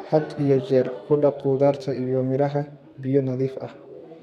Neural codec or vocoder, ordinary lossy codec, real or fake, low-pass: codec, 32 kHz, 1.9 kbps, SNAC; none; fake; 14.4 kHz